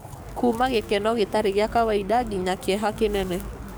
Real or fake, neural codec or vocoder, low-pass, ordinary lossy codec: fake; codec, 44.1 kHz, 7.8 kbps, DAC; none; none